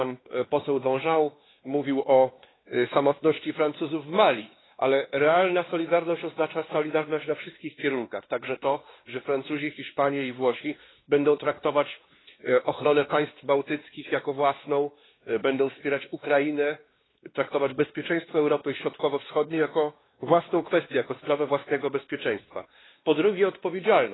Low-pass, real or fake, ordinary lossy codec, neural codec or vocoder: 7.2 kHz; fake; AAC, 16 kbps; codec, 16 kHz, 2 kbps, X-Codec, WavLM features, trained on Multilingual LibriSpeech